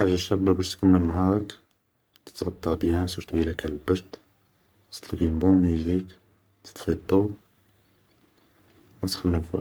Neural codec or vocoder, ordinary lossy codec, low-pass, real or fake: codec, 44.1 kHz, 3.4 kbps, Pupu-Codec; none; none; fake